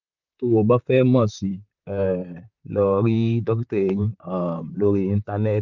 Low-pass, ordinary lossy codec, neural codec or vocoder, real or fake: 7.2 kHz; none; vocoder, 44.1 kHz, 128 mel bands, Pupu-Vocoder; fake